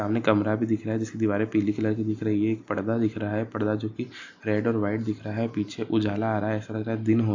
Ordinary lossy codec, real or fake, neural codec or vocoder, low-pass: AAC, 48 kbps; real; none; 7.2 kHz